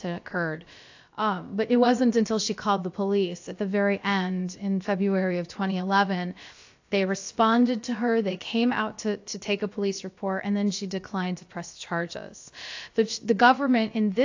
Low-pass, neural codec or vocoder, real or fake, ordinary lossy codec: 7.2 kHz; codec, 16 kHz, about 1 kbps, DyCAST, with the encoder's durations; fake; AAC, 48 kbps